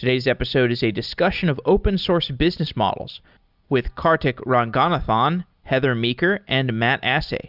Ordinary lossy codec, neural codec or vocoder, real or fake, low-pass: Opus, 64 kbps; none; real; 5.4 kHz